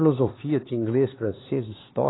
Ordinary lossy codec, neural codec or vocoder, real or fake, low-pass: AAC, 16 kbps; codec, 16 kHz, 4 kbps, X-Codec, HuBERT features, trained on LibriSpeech; fake; 7.2 kHz